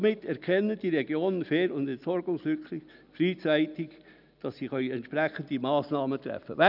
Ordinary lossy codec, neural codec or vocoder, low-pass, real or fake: none; none; 5.4 kHz; real